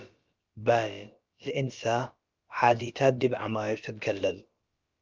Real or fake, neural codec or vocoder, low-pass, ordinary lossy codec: fake; codec, 16 kHz, about 1 kbps, DyCAST, with the encoder's durations; 7.2 kHz; Opus, 24 kbps